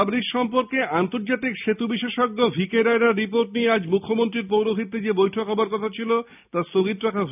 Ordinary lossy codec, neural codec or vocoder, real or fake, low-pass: none; none; real; 3.6 kHz